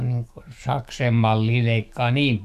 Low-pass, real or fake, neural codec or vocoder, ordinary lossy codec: 14.4 kHz; fake; codec, 44.1 kHz, 7.8 kbps, DAC; none